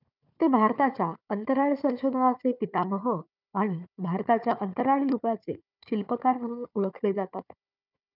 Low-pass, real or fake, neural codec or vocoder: 5.4 kHz; fake; codec, 16 kHz, 4 kbps, FunCodec, trained on Chinese and English, 50 frames a second